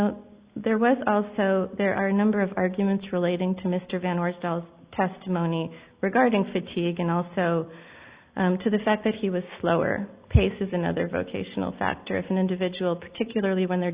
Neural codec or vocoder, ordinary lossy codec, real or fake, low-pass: none; Opus, 64 kbps; real; 3.6 kHz